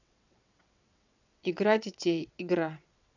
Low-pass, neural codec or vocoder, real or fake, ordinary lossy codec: 7.2 kHz; vocoder, 22.05 kHz, 80 mel bands, WaveNeXt; fake; none